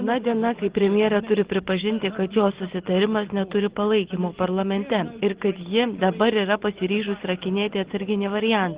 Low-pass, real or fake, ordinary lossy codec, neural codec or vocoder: 3.6 kHz; real; Opus, 16 kbps; none